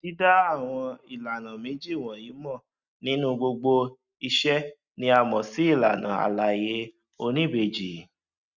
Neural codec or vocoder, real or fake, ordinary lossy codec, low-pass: none; real; Opus, 64 kbps; 7.2 kHz